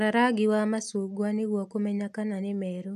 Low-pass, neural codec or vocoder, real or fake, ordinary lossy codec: 14.4 kHz; none; real; MP3, 96 kbps